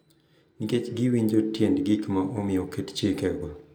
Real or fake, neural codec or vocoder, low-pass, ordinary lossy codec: real; none; none; none